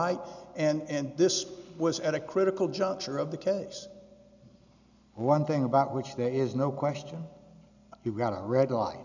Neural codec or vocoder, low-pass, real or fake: none; 7.2 kHz; real